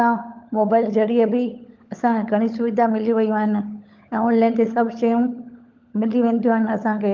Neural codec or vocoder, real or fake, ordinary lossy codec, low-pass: codec, 16 kHz, 16 kbps, FunCodec, trained on LibriTTS, 50 frames a second; fake; Opus, 32 kbps; 7.2 kHz